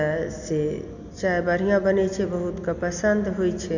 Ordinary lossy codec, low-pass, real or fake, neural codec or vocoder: none; 7.2 kHz; real; none